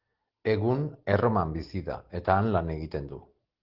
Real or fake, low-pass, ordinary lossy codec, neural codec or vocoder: real; 5.4 kHz; Opus, 16 kbps; none